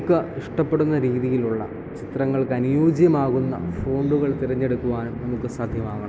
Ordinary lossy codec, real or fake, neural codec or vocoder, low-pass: none; real; none; none